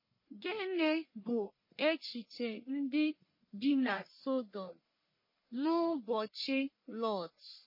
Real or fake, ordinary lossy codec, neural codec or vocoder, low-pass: fake; MP3, 24 kbps; codec, 44.1 kHz, 1.7 kbps, Pupu-Codec; 5.4 kHz